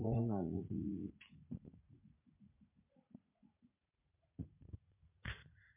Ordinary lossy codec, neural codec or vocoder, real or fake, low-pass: MP3, 32 kbps; vocoder, 44.1 kHz, 80 mel bands, Vocos; fake; 3.6 kHz